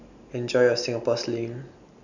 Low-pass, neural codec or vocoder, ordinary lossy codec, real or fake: 7.2 kHz; none; none; real